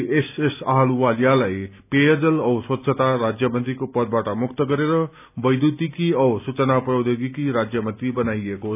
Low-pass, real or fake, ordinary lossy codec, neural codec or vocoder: 3.6 kHz; real; none; none